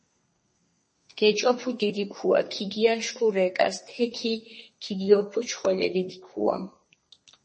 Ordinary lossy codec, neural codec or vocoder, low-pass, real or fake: MP3, 32 kbps; codec, 32 kHz, 1.9 kbps, SNAC; 10.8 kHz; fake